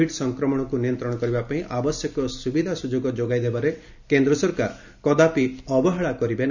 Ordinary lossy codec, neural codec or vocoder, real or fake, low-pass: none; none; real; 7.2 kHz